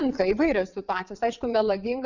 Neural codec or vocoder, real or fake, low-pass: vocoder, 22.05 kHz, 80 mel bands, WaveNeXt; fake; 7.2 kHz